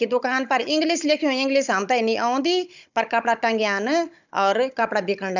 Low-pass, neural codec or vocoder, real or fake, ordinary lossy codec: 7.2 kHz; codec, 16 kHz, 16 kbps, FunCodec, trained on Chinese and English, 50 frames a second; fake; none